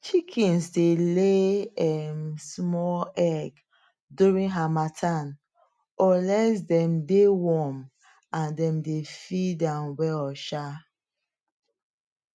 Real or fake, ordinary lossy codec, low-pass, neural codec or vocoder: real; none; none; none